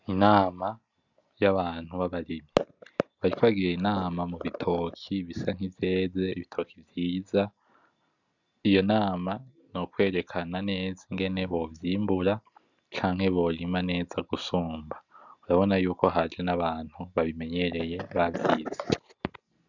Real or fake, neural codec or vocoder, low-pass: real; none; 7.2 kHz